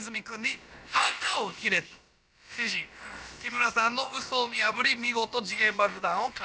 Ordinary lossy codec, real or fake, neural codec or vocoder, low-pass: none; fake; codec, 16 kHz, about 1 kbps, DyCAST, with the encoder's durations; none